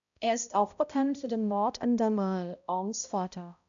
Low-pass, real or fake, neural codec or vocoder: 7.2 kHz; fake; codec, 16 kHz, 0.5 kbps, X-Codec, HuBERT features, trained on balanced general audio